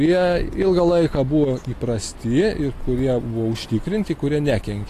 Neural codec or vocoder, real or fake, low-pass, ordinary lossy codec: none; real; 14.4 kHz; AAC, 48 kbps